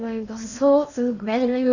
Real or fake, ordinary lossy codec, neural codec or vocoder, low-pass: fake; none; codec, 16 kHz in and 24 kHz out, 0.8 kbps, FocalCodec, streaming, 65536 codes; 7.2 kHz